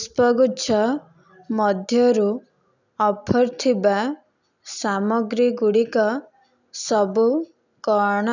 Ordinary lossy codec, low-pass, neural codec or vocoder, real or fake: none; 7.2 kHz; none; real